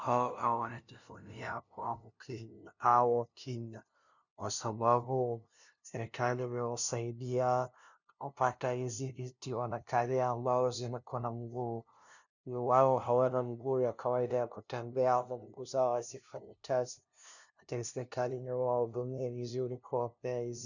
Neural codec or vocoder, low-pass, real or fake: codec, 16 kHz, 0.5 kbps, FunCodec, trained on LibriTTS, 25 frames a second; 7.2 kHz; fake